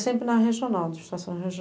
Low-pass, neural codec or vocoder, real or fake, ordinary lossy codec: none; none; real; none